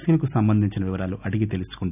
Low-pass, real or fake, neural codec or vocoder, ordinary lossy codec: 3.6 kHz; real; none; none